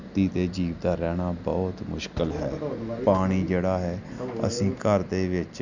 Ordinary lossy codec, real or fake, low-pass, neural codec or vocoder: none; real; 7.2 kHz; none